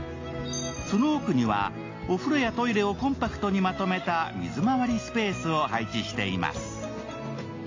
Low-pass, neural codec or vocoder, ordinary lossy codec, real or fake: 7.2 kHz; none; AAC, 32 kbps; real